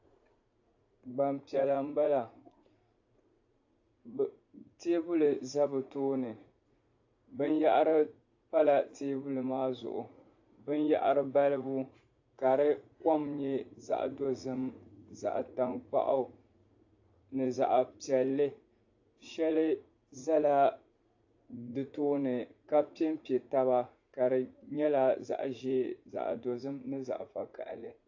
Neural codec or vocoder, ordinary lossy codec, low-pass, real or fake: vocoder, 44.1 kHz, 80 mel bands, Vocos; AAC, 48 kbps; 7.2 kHz; fake